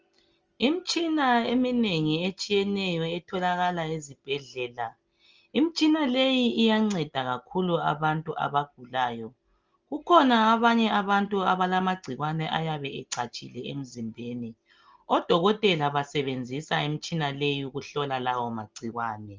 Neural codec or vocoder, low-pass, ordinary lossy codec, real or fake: none; 7.2 kHz; Opus, 24 kbps; real